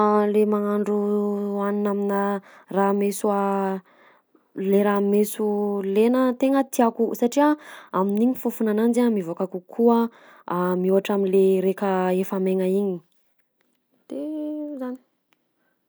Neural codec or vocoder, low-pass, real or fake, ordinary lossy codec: none; none; real; none